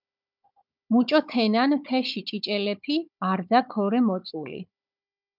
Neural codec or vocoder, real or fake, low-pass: codec, 16 kHz, 16 kbps, FunCodec, trained on Chinese and English, 50 frames a second; fake; 5.4 kHz